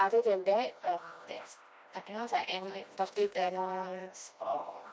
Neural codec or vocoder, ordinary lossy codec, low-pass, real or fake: codec, 16 kHz, 1 kbps, FreqCodec, smaller model; none; none; fake